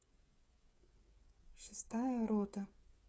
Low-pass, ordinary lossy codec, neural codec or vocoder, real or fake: none; none; codec, 16 kHz, 8 kbps, FreqCodec, smaller model; fake